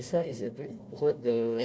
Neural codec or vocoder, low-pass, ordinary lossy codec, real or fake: codec, 16 kHz, 1 kbps, FunCodec, trained on Chinese and English, 50 frames a second; none; none; fake